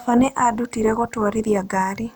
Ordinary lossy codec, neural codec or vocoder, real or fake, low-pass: none; none; real; none